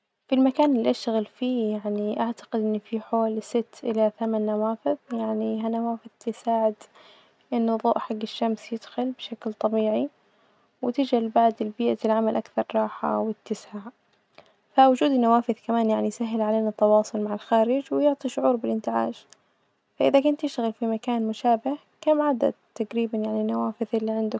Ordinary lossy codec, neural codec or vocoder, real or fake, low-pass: none; none; real; none